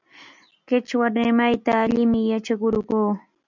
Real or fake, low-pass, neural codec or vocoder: real; 7.2 kHz; none